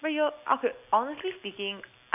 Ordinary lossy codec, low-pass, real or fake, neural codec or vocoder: none; 3.6 kHz; real; none